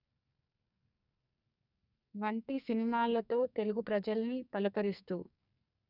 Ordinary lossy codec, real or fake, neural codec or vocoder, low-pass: none; fake; codec, 44.1 kHz, 2.6 kbps, SNAC; 5.4 kHz